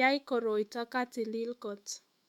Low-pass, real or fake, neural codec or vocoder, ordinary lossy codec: 19.8 kHz; fake; autoencoder, 48 kHz, 128 numbers a frame, DAC-VAE, trained on Japanese speech; MP3, 96 kbps